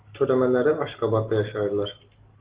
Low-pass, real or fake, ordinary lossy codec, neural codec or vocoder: 3.6 kHz; real; Opus, 16 kbps; none